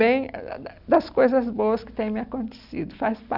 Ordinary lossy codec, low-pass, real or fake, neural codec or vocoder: none; 5.4 kHz; real; none